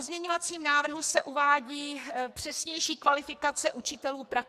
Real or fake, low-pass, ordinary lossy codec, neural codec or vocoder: fake; 14.4 kHz; MP3, 96 kbps; codec, 44.1 kHz, 2.6 kbps, SNAC